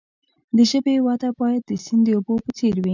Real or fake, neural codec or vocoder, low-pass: real; none; 7.2 kHz